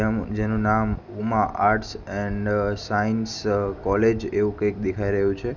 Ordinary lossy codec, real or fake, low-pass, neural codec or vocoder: none; real; 7.2 kHz; none